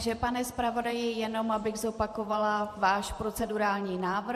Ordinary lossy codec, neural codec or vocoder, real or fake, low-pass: MP3, 64 kbps; vocoder, 44.1 kHz, 128 mel bands every 512 samples, BigVGAN v2; fake; 14.4 kHz